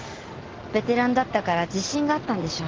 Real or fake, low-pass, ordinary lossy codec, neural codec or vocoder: real; 7.2 kHz; Opus, 16 kbps; none